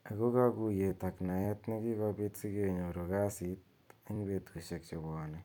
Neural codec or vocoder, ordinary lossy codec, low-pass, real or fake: vocoder, 44.1 kHz, 128 mel bands every 256 samples, BigVGAN v2; none; 19.8 kHz; fake